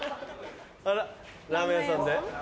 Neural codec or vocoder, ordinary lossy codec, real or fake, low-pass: none; none; real; none